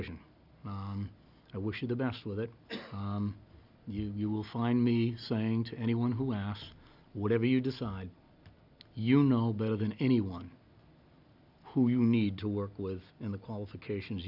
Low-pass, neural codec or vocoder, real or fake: 5.4 kHz; none; real